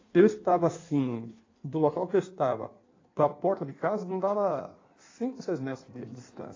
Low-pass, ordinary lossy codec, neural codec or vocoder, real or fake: 7.2 kHz; AAC, 32 kbps; codec, 16 kHz in and 24 kHz out, 1.1 kbps, FireRedTTS-2 codec; fake